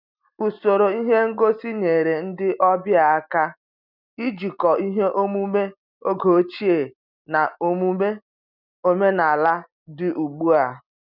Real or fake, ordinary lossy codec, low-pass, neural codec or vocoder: real; none; 5.4 kHz; none